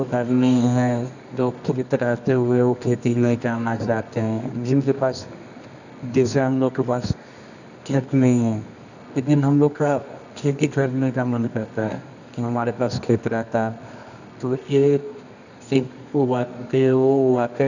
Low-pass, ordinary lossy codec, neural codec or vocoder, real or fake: 7.2 kHz; none; codec, 24 kHz, 0.9 kbps, WavTokenizer, medium music audio release; fake